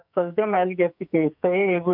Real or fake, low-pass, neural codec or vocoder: fake; 5.4 kHz; codec, 44.1 kHz, 2.6 kbps, SNAC